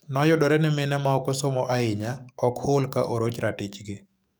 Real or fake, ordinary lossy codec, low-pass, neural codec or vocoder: fake; none; none; codec, 44.1 kHz, 7.8 kbps, DAC